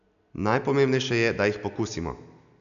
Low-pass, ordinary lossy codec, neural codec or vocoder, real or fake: 7.2 kHz; none; none; real